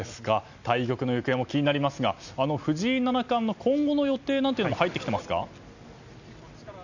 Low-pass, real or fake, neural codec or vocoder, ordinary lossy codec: 7.2 kHz; real; none; none